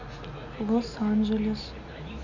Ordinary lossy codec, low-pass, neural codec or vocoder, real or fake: none; 7.2 kHz; none; real